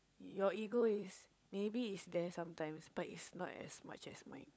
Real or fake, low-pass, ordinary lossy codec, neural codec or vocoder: fake; none; none; codec, 16 kHz, 16 kbps, FunCodec, trained on LibriTTS, 50 frames a second